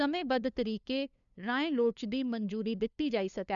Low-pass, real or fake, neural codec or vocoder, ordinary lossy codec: 7.2 kHz; fake; codec, 16 kHz, 2 kbps, FunCodec, trained on LibriTTS, 25 frames a second; none